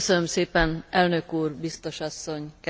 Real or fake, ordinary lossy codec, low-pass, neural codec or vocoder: real; none; none; none